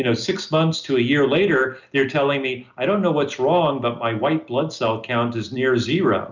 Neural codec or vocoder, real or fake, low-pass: none; real; 7.2 kHz